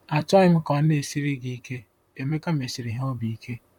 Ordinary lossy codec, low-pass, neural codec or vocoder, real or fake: none; 19.8 kHz; vocoder, 44.1 kHz, 128 mel bands, Pupu-Vocoder; fake